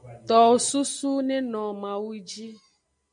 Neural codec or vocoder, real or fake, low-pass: none; real; 9.9 kHz